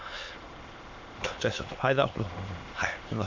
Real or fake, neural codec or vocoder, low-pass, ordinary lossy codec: fake; autoencoder, 22.05 kHz, a latent of 192 numbers a frame, VITS, trained on many speakers; 7.2 kHz; MP3, 48 kbps